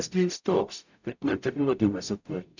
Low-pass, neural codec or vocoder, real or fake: 7.2 kHz; codec, 44.1 kHz, 0.9 kbps, DAC; fake